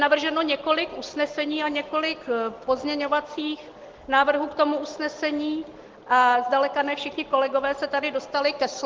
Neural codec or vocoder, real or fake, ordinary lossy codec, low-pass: none; real; Opus, 16 kbps; 7.2 kHz